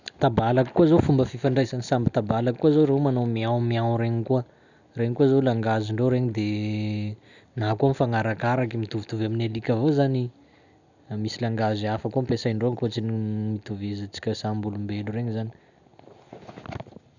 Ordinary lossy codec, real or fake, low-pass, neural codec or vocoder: none; real; 7.2 kHz; none